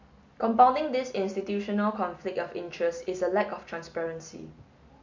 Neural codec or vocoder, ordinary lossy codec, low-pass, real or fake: none; MP3, 48 kbps; 7.2 kHz; real